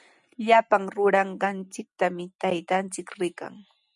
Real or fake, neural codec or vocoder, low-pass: real; none; 10.8 kHz